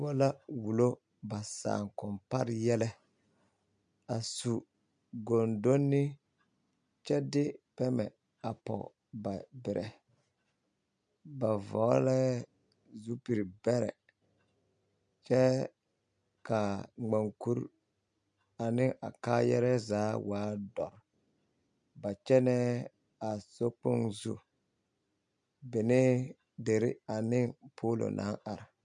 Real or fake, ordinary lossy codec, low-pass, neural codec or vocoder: real; MP3, 64 kbps; 9.9 kHz; none